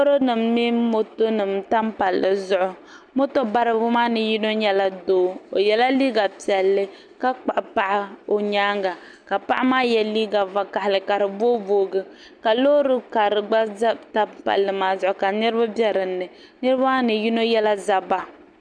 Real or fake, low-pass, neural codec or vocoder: real; 9.9 kHz; none